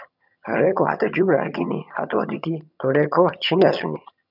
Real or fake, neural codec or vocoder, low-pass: fake; vocoder, 22.05 kHz, 80 mel bands, HiFi-GAN; 5.4 kHz